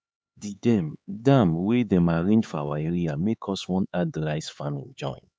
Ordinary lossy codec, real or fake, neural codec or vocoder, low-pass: none; fake; codec, 16 kHz, 4 kbps, X-Codec, HuBERT features, trained on LibriSpeech; none